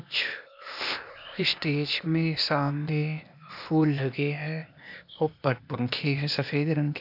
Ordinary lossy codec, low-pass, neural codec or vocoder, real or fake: none; 5.4 kHz; codec, 16 kHz, 0.8 kbps, ZipCodec; fake